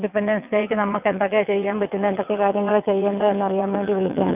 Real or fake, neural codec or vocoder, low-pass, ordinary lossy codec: fake; vocoder, 22.05 kHz, 80 mel bands, WaveNeXt; 3.6 kHz; none